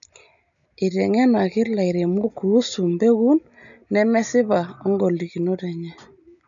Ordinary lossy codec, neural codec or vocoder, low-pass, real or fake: MP3, 96 kbps; none; 7.2 kHz; real